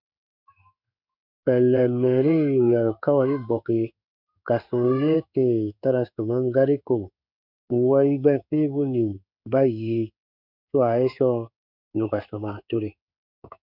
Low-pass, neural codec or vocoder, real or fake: 5.4 kHz; codec, 16 kHz in and 24 kHz out, 1 kbps, XY-Tokenizer; fake